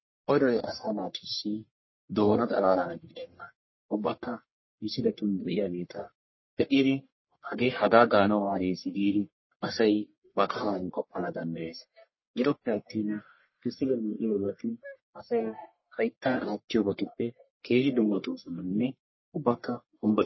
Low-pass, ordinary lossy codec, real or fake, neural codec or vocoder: 7.2 kHz; MP3, 24 kbps; fake; codec, 44.1 kHz, 1.7 kbps, Pupu-Codec